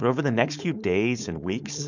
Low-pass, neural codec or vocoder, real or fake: 7.2 kHz; codec, 16 kHz, 4.8 kbps, FACodec; fake